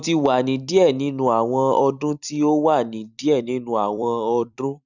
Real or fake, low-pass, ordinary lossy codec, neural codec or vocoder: fake; 7.2 kHz; none; vocoder, 44.1 kHz, 128 mel bands every 256 samples, BigVGAN v2